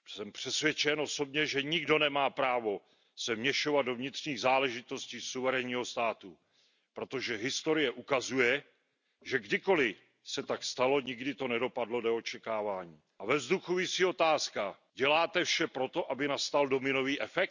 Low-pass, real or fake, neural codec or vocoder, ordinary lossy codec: 7.2 kHz; real; none; none